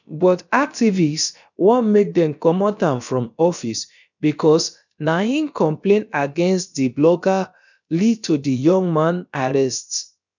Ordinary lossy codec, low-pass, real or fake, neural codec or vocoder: none; 7.2 kHz; fake; codec, 16 kHz, about 1 kbps, DyCAST, with the encoder's durations